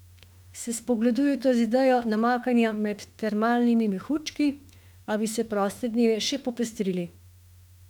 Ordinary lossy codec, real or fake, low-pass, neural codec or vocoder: none; fake; 19.8 kHz; autoencoder, 48 kHz, 32 numbers a frame, DAC-VAE, trained on Japanese speech